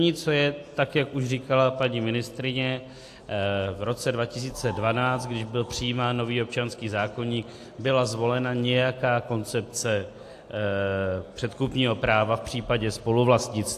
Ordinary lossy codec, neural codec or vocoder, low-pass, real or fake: AAC, 64 kbps; none; 14.4 kHz; real